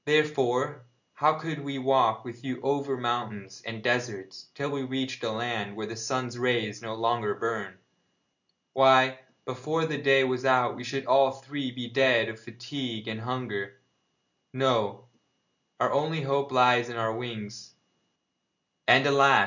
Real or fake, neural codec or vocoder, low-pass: real; none; 7.2 kHz